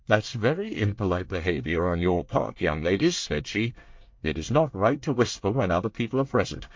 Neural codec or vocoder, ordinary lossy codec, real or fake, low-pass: codec, 24 kHz, 1 kbps, SNAC; MP3, 48 kbps; fake; 7.2 kHz